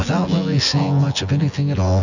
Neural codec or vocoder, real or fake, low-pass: vocoder, 24 kHz, 100 mel bands, Vocos; fake; 7.2 kHz